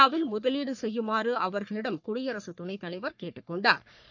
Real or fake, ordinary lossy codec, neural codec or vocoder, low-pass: fake; none; codec, 44.1 kHz, 3.4 kbps, Pupu-Codec; 7.2 kHz